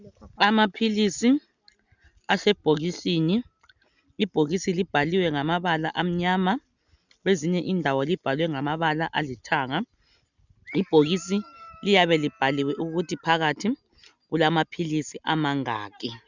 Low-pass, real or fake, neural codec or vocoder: 7.2 kHz; real; none